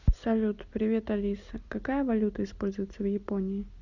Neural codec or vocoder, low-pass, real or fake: none; 7.2 kHz; real